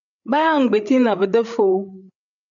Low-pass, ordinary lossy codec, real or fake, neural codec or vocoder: 7.2 kHz; MP3, 96 kbps; fake; codec, 16 kHz, 8 kbps, FreqCodec, larger model